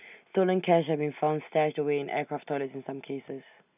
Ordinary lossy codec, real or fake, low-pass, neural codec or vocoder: none; real; 3.6 kHz; none